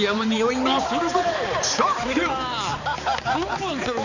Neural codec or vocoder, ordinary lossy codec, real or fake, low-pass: codec, 16 kHz, 4 kbps, X-Codec, HuBERT features, trained on balanced general audio; none; fake; 7.2 kHz